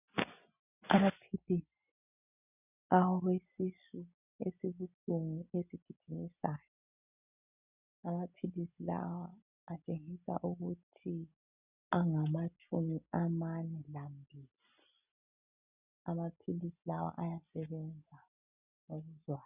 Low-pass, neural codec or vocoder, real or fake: 3.6 kHz; none; real